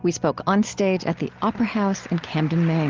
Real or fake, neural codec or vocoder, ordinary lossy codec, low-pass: real; none; Opus, 32 kbps; 7.2 kHz